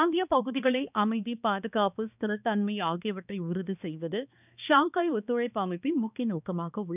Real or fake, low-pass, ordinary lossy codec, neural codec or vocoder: fake; 3.6 kHz; none; codec, 16 kHz, 2 kbps, X-Codec, HuBERT features, trained on balanced general audio